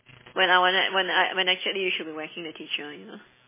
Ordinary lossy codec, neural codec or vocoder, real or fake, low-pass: MP3, 16 kbps; none; real; 3.6 kHz